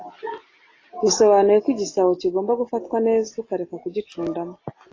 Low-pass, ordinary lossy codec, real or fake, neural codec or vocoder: 7.2 kHz; AAC, 32 kbps; real; none